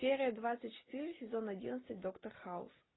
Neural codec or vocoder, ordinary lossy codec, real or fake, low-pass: none; AAC, 16 kbps; real; 7.2 kHz